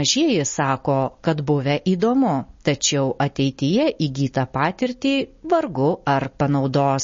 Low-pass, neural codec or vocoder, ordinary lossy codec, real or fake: 7.2 kHz; none; MP3, 32 kbps; real